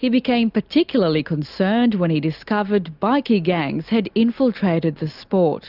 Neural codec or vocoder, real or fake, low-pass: none; real; 5.4 kHz